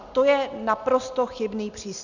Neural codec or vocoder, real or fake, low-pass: none; real; 7.2 kHz